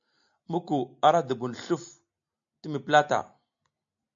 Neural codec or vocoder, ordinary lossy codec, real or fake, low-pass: none; AAC, 64 kbps; real; 7.2 kHz